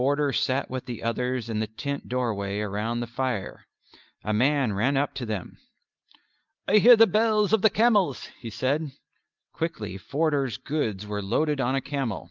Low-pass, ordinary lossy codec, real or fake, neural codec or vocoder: 7.2 kHz; Opus, 24 kbps; real; none